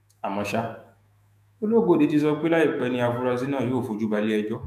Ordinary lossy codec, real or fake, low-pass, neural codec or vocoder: none; fake; 14.4 kHz; autoencoder, 48 kHz, 128 numbers a frame, DAC-VAE, trained on Japanese speech